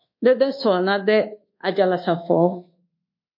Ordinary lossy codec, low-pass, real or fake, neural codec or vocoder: MP3, 32 kbps; 5.4 kHz; fake; codec, 24 kHz, 1.2 kbps, DualCodec